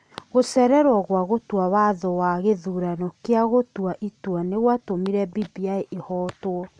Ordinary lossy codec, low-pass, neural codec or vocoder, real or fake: none; 9.9 kHz; none; real